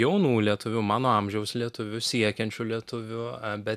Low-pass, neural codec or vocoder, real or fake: 14.4 kHz; none; real